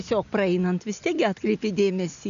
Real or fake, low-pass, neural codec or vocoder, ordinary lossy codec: real; 7.2 kHz; none; MP3, 96 kbps